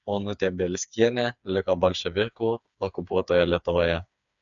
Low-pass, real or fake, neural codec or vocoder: 7.2 kHz; fake; codec, 16 kHz, 4 kbps, FreqCodec, smaller model